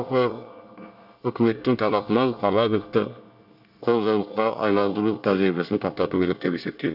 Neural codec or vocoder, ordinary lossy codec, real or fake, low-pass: codec, 24 kHz, 1 kbps, SNAC; none; fake; 5.4 kHz